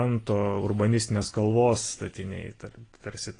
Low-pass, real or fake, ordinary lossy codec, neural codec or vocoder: 9.9 kHz; real; AAC, 32 kbps; none